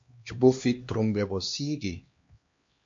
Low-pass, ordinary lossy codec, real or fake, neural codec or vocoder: 7.2 kHz; MP3, 48 kbps; fake; codec, 16 kHz, 2 kbps, X-Codec, HuBERT features, trained on LibriSpeech